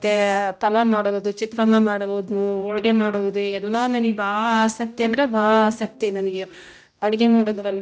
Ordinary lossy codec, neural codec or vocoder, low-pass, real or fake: none; codec, 16 kHz, 0.5 kbps, X-Codec, HuBERT features, trained on general audio; none; fake